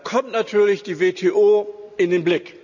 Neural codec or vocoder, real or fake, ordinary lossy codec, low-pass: none; real; none; 7.2 kHz